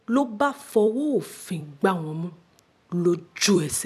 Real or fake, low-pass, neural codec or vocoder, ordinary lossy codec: fake; 14.4 kHz; vocoder, 44.1 kHz, 128 mel bands every 256 samples, BigVGAN v2; none